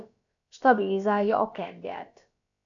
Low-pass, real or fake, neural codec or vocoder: 7.2 kHz; fake; codec, 16 kHz, about 1 kbps, DyCAST, with the encoder's durations